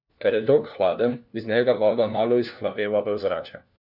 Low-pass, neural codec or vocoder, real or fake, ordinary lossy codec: 5.4 kHz; codec, 16 kHz, 1 kbps, FunCodec, trained on LibriTTS, 50 frames a second; fake; none